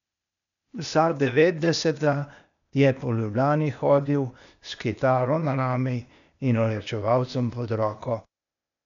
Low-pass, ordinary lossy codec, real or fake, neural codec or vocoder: 7.2 kHz; none; fake; codec, 16 kHz, 0.8 kbps, ZipCodec